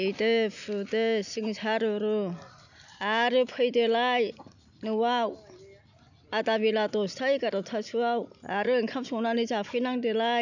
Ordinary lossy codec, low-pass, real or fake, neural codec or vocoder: none; 7.2 kHz; real; none